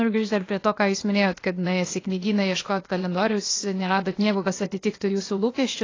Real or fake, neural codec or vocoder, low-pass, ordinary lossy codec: fake; codec, 16 kHz, 0.8 kbps, ZipCodec; 7.2 kHz; AAC, 32 kbps